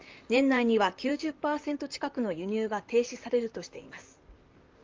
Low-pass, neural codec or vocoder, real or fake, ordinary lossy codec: 7.2 kHz; vocoder, 44.1 kHz, 128 mel bands, Pupu-Vocoder; fake; Opus, 32 kbps